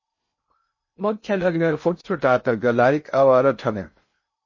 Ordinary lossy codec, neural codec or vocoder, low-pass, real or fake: MP3, 32 kbps; codec, 16 kHz in and 24 kHz out, 0.6 kbps, FocalCodec, streaming, 2048 codes; 7.2 kHz; fake